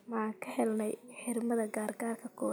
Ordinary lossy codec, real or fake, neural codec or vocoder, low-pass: none; real; none; none